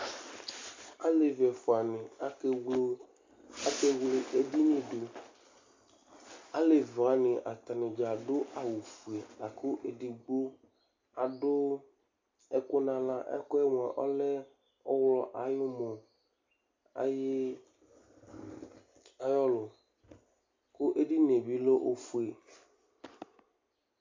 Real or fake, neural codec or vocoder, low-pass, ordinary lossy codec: real; none; 7.2 kHz; MP3, 64 kbps